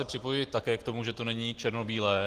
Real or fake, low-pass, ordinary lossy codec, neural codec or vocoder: real; 14.4 kHz; Opus, 16 kbps; none